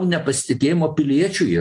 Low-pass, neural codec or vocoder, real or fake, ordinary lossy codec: 10.8 kHz; none; real; AAC, 64 kbps